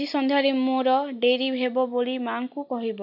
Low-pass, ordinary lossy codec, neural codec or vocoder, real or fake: 5.4 kHz; none; none; real